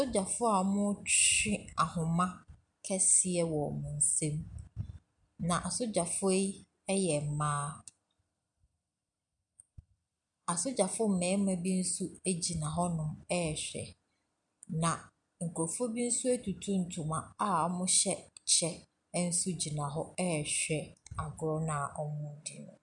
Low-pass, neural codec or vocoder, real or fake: 10.8 kHz; none; real